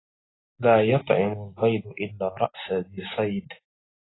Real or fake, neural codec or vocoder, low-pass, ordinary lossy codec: real; none; 7.2 kHz; AAC, 16 kbps